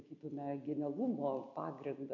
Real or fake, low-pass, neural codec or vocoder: real; 7.2 kHz; none